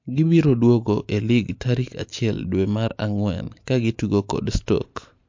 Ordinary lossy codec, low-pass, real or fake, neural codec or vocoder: MP3, 48 kbps; 7.2 kHz; real; none